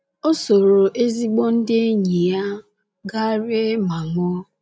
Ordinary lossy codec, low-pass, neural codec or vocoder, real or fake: none; none; none; real